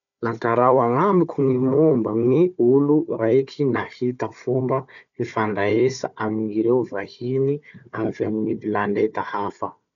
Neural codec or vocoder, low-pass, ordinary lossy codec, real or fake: codec, 16 kHz, 4 kbps, FunCodec, trained on Chinese and English, 50 frames a second; 7.2 kHz; MP3, 96 kbps; fake